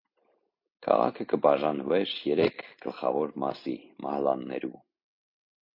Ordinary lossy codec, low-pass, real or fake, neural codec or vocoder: MP3, 32 kbps; 5.4 kHz; real; none